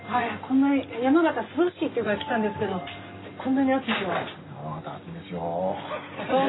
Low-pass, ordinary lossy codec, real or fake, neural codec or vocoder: 7.2 kHz; AAC, 16 kbps; real; none